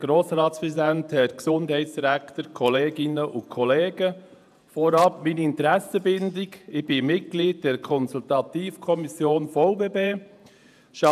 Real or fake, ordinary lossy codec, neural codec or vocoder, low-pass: fake; none; vocoder, 48 kHz, 128 mel bands, Vocos; 14.4 kHz